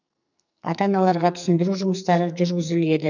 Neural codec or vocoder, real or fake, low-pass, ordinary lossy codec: codec, 44.1 kHz, 2.6 kbps, SNAC; fake; 7.2 kHz; none